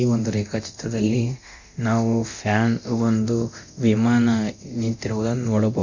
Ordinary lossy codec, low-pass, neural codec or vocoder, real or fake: Opus, 64 kbps; 7.2 kHz; codec, 24 kHz, 0.9 kbps, DualCodec; fake